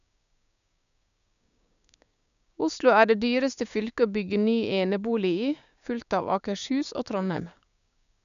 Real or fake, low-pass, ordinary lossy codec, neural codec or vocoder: fake; 7.2 kHz; none; codec, 16 kHz, 6 kbps, DAC